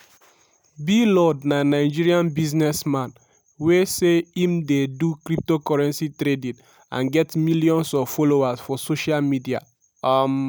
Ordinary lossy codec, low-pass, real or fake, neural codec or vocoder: none; none; real; none